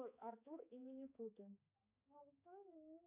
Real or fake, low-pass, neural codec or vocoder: fake; 3.6 kHz; codec, 16 kHz, 4 kbps, X-Codec, HuBERT features, trained on balanced general audio